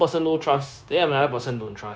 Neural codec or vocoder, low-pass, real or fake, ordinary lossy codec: codec, 16 kHz, 0.9 kbps, LongCat-Audio-Codec; none; fake; none